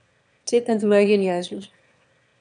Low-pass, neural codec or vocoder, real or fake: 9.9 kHz; autoencoder, 22.05 kHz, a latent of 192 numbers a frame, VITS, trained on one speaker; fake